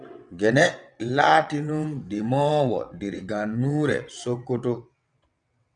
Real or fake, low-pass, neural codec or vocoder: fake; 9.9 kHz; vocoder, 22.05 kHz, 80 mel bands, WaveNeXt